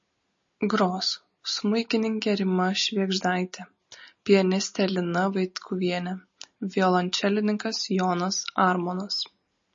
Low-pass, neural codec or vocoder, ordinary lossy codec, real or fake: 7.2 kHz; none; MP3, 32 kbps; real